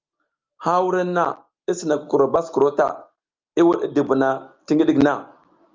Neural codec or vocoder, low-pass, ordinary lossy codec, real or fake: none; 7.2 kHz; Opus, 24 kbps; real